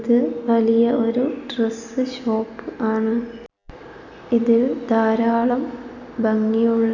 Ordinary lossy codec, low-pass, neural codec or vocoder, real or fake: none; 7.2 kHz; none; real